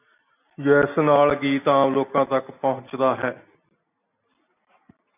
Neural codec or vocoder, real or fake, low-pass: none; real; 3.6 kHz